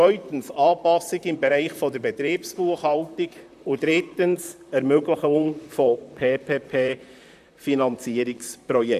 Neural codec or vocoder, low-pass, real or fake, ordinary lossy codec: vocoder, 44.1 kHz, 128 mel bands, Pupu-Vocoder; 14.4 kHz; fake; none